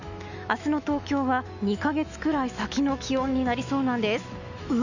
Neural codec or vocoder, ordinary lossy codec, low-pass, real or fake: autoencoder, 48 kHz, 128 numbers a frame, DAC-VAE, trained on Japanese speech; none; 7.2 kHz; fake